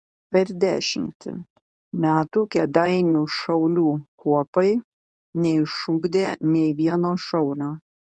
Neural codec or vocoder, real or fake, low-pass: codec, 24 kHz, 0.9 kbps, WavTokenizer, medium speech release version 2; fake; 10.8 kHz